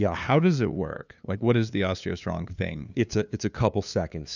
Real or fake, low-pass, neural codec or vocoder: fake; 7.2 kHz; codec, 16 kHz, 2 kbps, FunCodec, trained on LibriTTS, 25 frames a second